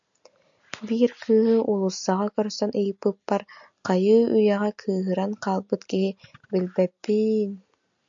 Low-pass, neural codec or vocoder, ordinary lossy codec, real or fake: 7.2 kHz; none; AAC, 64 kbps; real